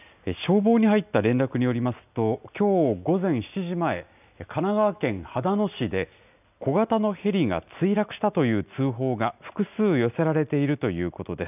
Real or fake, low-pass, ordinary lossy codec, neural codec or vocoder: real; 3.6 kHz; none; none